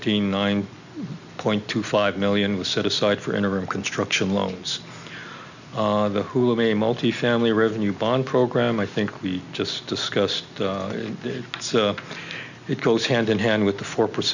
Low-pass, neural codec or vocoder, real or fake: 7.2 kHz; none; real